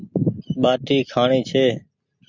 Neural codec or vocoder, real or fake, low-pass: none; real; 7.2 kHz